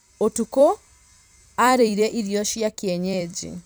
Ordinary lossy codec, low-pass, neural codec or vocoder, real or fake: none; none; vocoder, 44.1 kHz, 128 mel bands every 256 samples, BigVGAN v2; fake